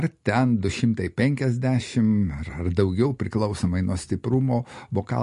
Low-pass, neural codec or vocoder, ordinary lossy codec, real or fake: 10.8 kHz; none; MP3, 48 kbps; real